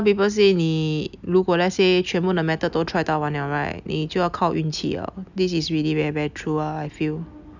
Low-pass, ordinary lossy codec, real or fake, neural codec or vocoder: 7.2 kHz; none; real; none